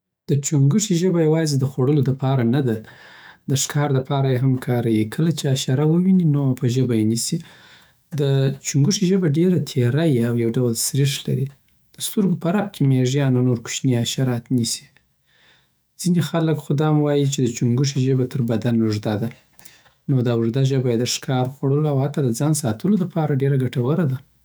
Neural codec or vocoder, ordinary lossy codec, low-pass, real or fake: autoencoder, 48 kHz, 128 numbers a frame, DAC-VAE, trained on Japanese speech; none; none; fake